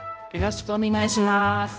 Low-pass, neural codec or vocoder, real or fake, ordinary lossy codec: none; codec, 16 kHz, 0.5 kbps, X-Codec, HuBERT features, trained on balanced general audio; fake; none